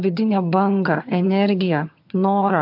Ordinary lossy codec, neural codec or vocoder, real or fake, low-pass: MP3, 48 kbps; vocoder, 22.05 kHz, 80 mel bands, HiFi-GAN; fake; 5.4 kHz